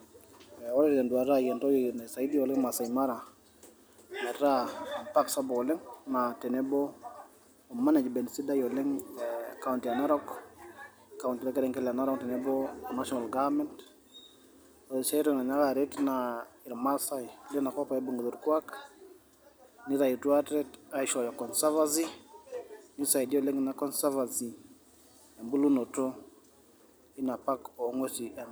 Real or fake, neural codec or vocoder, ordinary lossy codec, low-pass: real; none; none; none